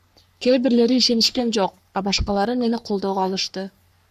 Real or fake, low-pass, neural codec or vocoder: fake; 14.4 kHz; codec, 44.1 kHz, 3.4 kbps, Pupu-Codec